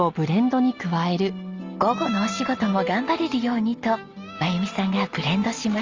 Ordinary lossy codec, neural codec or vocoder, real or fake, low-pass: Opus, 32 kbps; none; real; 7.2 kHz